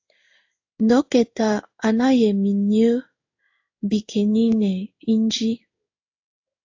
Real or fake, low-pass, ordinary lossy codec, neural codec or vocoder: fake; 7.2 kHz; AAC, 48 kbps; codec, 16 kHz in and 24 kHz out, 1 kbps, XY-Tokenizer